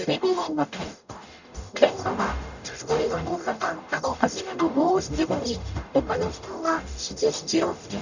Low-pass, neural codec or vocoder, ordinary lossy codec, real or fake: 7.2 kHz; codec, 44.1 kHz, 0.9 kbps, DAC; none; fake